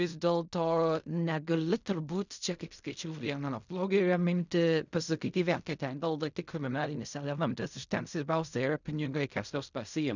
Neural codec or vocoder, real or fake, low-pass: codec, 16 kHz in and 24 kHz out, 0.4 kbps, LongCat-Audio-Codec, fine tuned four codebook decoder; fake; 7.2 kHz